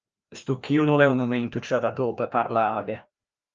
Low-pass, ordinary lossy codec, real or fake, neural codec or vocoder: 7.2 kHz; Opus, 24 kbps; fake; codec, 16 kHz, 1 kbps, FreqCodec, larger model